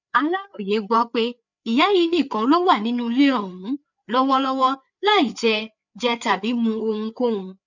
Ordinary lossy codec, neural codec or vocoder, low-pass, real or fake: none; codec, 16 kHz, 4 kbps, FreqCodec, larger model; 7.2 kHz; fake